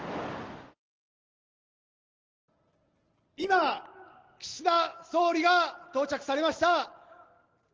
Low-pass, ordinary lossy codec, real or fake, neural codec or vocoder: 7.2 kHz; Opus, 16 kbps; real; none